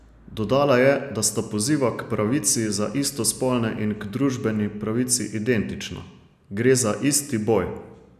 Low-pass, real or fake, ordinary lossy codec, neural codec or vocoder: 14.4 kHz; real; none; none